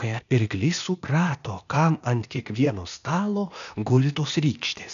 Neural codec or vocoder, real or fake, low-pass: codec, 16 kHz, 0.8 kbps, ZipCodec; fake; 7.2 kHz